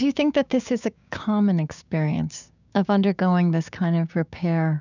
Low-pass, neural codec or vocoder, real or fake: 7.2 kHz; codec, 16 kHz, 6 kbps, DAC; fake